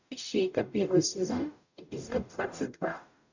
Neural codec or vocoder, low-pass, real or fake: codec, 44.1 kHz, 0.9 kbps, DAC; 7.2 kHz; fake